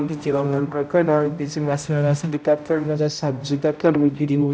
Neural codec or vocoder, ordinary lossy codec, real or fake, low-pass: codec, 16 kHz, 0.5 kbps, X-Codec, HuBERT features, trained on balanced general audio; none; fake; none